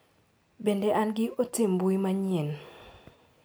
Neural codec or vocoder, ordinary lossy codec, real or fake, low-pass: none; none; real; none